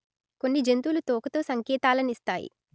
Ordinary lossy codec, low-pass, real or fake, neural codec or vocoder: none; none; real; none